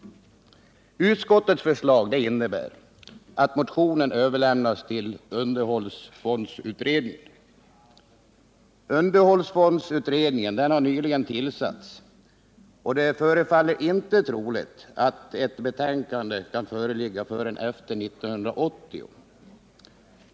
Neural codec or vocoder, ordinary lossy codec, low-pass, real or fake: none; none; none; real